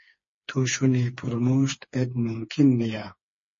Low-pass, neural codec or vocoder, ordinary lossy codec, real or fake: 7.2 kHz; codec, 16 kHz, 4 kbps, FreqCodec, smaller model; MP3, 32 kbps; fake